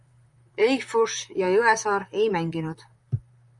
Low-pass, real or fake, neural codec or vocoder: 10.8 kHz; fake; vocoder, 44.1 kHz, 128 mel bands, Pupu-Vocoder